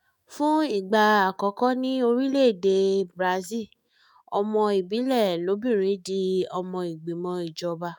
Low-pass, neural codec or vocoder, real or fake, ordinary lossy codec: 19.8 kHz; autoencoder, 48 kHz, 128 numbers a frame, DAC-VAE, trained on Japanese speech; fake; none